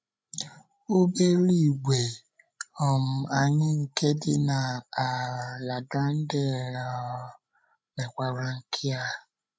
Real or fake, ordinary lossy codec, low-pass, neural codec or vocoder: fake; none; none; codec, 16 kHz, 16 kbps, FreqCodec, larger model